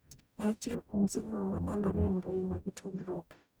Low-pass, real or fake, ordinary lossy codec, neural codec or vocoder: none; fake; none; codec, 44.1 kHz, 0.9 kbps, DAC